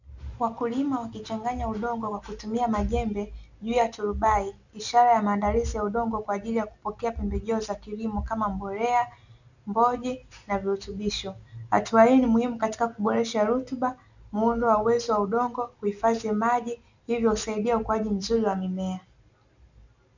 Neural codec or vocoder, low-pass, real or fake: none; 7.2 kHz; real